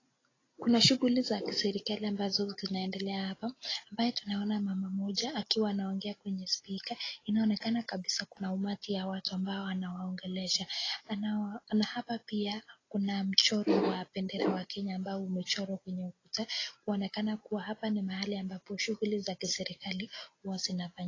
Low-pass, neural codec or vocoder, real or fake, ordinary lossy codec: 7.2 kHz; none; real; AAC, 32 kbps